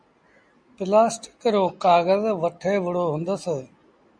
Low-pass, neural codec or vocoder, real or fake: 9.9 kHz; none; real